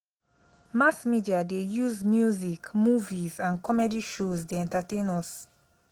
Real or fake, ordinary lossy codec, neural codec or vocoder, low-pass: fake; Opus, 16 kbps; autoencoder, 48 kHz, 128 numbers a frame, DAC-VAE, trained on Japanese speech; 19.8 kHz